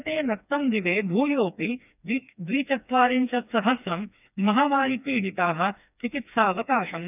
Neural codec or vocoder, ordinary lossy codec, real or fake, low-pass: codec, 16 kHz, 2 kbps, FreqCodec, smaller model; none; fake; 3.6 kHz